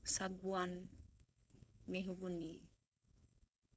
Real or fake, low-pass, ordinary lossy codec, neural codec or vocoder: fake; none; none; codec, 16 kHz, 4.8 kbps, FACodec